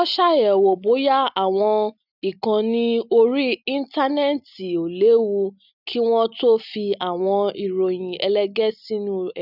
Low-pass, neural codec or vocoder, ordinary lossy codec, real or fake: 5.4 kHz; none; none; real